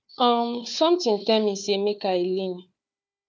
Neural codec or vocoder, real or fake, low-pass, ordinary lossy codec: codec, 16 kHz, 4 kbps, FunCodec, trained on Chinese and English, 50 frames a second; fake; none; none